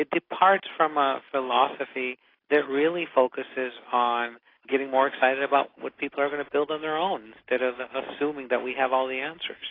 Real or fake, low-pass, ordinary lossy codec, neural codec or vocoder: real; 5.4 kHz; AAC, 24 kbps; none